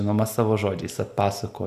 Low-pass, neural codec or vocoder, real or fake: 14.4 kHz; none; real